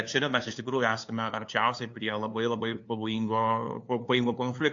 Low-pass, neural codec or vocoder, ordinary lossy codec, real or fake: 7.2 kHz; codec, 16 kHz, 2 kbps, FunCodec, trained on LibriTTS, 25 frames a second; AAC, 48 kbps; fake